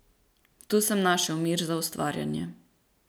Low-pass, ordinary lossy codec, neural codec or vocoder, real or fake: none; none; none; real